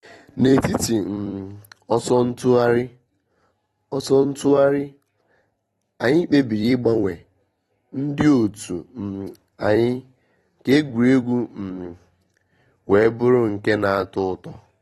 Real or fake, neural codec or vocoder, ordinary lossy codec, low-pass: fake; vocoder, 44.1 kHz, 128 mel bands every 256 samples, BigVGAN v2; AAC, 32 kbps; 19.8 kHz